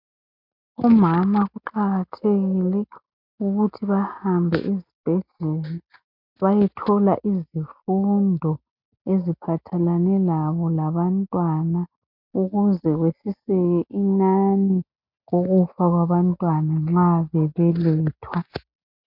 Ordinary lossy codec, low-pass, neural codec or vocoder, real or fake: MP3, 48 kbps; 5.4 kHz; none; real